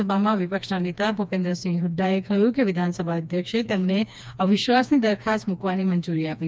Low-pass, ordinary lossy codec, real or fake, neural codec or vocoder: none; none; fake; codec, 16 kHz, 2 kbps, FreqCodec, smaller model